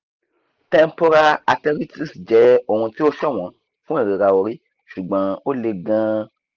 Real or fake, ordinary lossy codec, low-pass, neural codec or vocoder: real; Opus, 32 kbps; 7.2 kHz; none